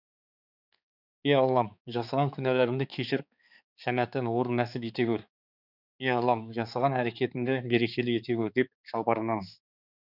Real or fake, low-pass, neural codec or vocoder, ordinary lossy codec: fake; 5.4 kHz; codec, 16 kHz, 4 kbps, X-Codec, HuBERT features, trained on balanced general audio; none